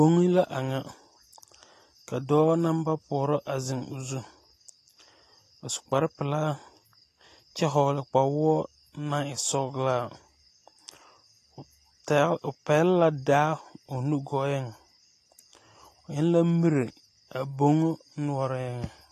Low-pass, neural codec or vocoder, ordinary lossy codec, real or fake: 14.4 kHz; none; AAC, 48 kbps; real